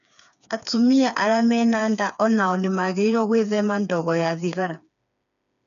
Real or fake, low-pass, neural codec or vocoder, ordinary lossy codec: fake; 7.2 kHz; codec, 16 kHz, 4 kbps, FreqCodec, smaller model; none